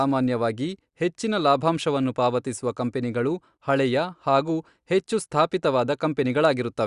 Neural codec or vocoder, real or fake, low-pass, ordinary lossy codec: none; real; 10.8 kHz; Opus, 64 kbps